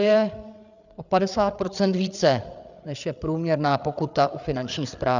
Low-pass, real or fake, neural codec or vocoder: 7.2 kHz; fake; codec, 16 kHz, 8 kbps, FreqCodec, larger model